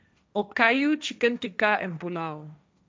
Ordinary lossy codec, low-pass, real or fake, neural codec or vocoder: none; none; fake; codec, 16 kHz, 1.1 kbps, Voila-Tokenizer